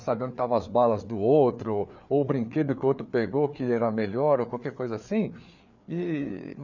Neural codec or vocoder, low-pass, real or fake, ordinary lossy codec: codec, 16 kHz, 4 kbps, FreqCodec, larger model; 7.2 kHz; fake; none